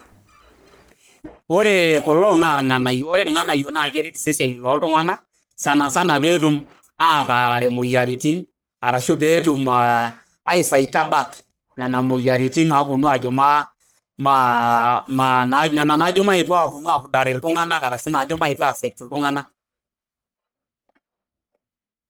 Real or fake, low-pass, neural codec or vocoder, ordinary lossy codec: fake; none; codec, 44.1 kHz, 1.7 kbps, Pupu-Codec; none